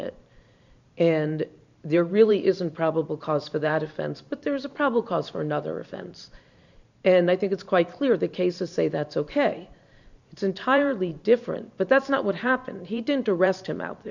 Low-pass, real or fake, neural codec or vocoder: 7.2 kHz; fake; vocoder, 44.1 kHz, 128 mel bands every 512 samples, BigVGAN v2